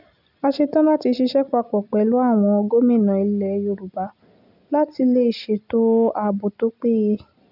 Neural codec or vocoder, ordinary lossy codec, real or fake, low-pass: none; none; real; 5.4 kHz